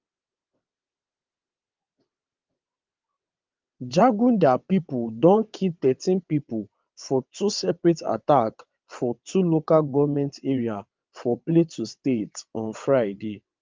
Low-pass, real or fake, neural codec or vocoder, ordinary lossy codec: 7.2 kHz; fake; vocoder, 22.05 kHz, 80 mel bands, WaveNeXt; Opus, 24 kbps